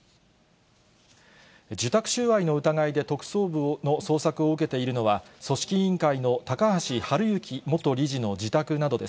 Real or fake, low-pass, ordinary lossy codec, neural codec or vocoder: real; none; none; none